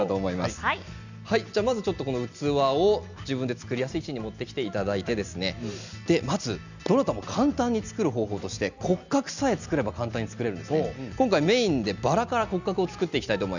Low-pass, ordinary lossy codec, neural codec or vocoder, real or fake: 7.2 kHz; none; none; real